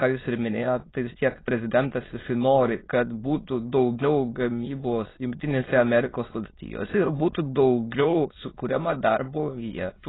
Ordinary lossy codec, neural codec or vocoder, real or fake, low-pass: AAC, 16 kbps; autoencoder, 22.05 kHz, a latent of 192 numbers a frame, VITS, trained on many speakers; fake; 7.2 kHz